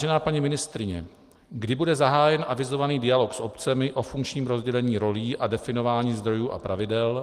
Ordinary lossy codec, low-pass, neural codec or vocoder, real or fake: Opus, 24 kbps; 14.4 kHz; none; real